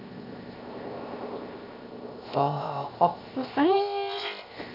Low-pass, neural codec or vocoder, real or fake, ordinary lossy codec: 5.4 kHz; codec, 16 kHz, 0.7 kbps, FocalCodec; fake; none